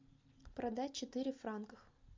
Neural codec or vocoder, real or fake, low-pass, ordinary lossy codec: none; real; 7.2 kHz; MP3, 64 kbps